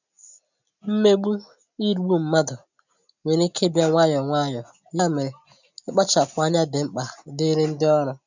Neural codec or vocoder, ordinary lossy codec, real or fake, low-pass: none; none; real; 7.2 kHz